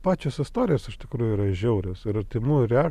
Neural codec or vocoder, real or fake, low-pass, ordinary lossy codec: none; real; 14.4 kHz; MP3, 96 kbps